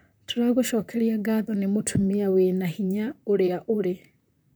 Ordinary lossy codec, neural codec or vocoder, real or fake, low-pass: none; vocoder, 44.1 kHz, 128 mel bands, Pupu-Vocoder; fake; none